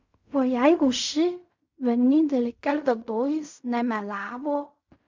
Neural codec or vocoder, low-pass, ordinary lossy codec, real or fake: codec, 16 kHz in and 24 kHz out, 0.4 kbps, LongCat-Audio-Codec, fine tuned four codebook decoder; 7.2 kHz; MP3, 64 kbps; fake